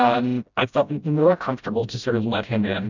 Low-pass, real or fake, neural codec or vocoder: 7.2 kHz; fake; codec, 16 kHz, 0.5 kbps, FreqCodec, smaller model